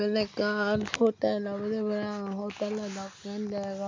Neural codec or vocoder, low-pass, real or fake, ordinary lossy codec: none; 7.2 kHz; real; MP3, 64 kbps